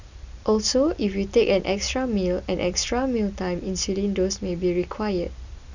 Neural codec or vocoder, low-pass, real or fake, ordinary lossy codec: none; 7.2 kHz; real; none